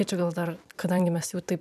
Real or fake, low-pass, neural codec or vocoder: fake; 14.4 kHz; vocoder, 44.1 kHz, 128 mel bands every 256 samples, BigVGAN v2